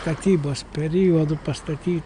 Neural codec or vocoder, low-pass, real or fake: none; 9.9 kHz; real